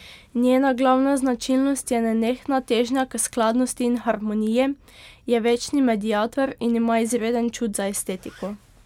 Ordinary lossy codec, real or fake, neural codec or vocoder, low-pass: MP3, 96 kbps; real; none; 19.8 kHz